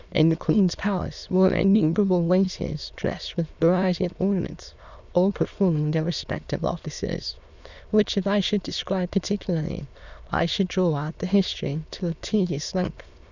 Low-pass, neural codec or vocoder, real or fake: 7.2 kHz; autoencoder, 22.05 kHz, a latent of 192 numbers a frame, VITS, trained on many speakers; fake